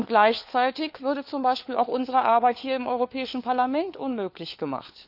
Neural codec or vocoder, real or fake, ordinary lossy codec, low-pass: codec, 16 kHz, 4 kbps, FunCodec, trained on LibriTTS, 50 frames a second; fake; none; 5.4 kHz